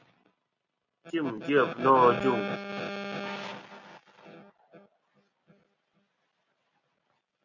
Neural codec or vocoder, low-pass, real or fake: none; 7.2 kHz; real